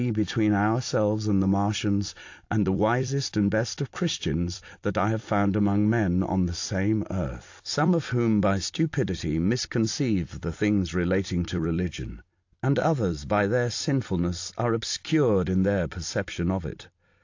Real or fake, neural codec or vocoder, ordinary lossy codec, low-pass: fake; vocoder, 44.1 kHz, 128 mel bands every 256 samples, BigVGAN v2; AAC, 48 kbps; 7.2 kHz